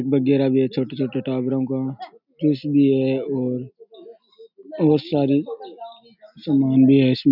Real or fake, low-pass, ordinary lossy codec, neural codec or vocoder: real; 5.4 kHz; none; none